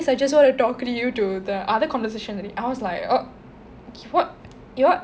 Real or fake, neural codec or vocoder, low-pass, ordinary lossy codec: real; none; none; none